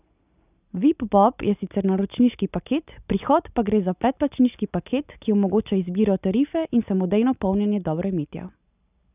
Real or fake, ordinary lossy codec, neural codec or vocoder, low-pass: real; none; none; 3.6 kHz